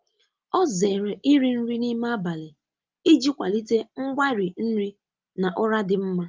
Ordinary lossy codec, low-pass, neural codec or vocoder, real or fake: Opus, 24 kbps; 7.2 kHz; none; real